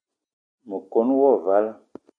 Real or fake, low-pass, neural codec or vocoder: real; 9.9 kHz; none